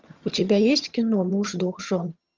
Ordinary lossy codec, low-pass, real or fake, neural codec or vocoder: Opus, 32 kbps; 7.2 kHz; fake; vocoder, 22.05 kHz, 80 mel bands, HiFi-GAN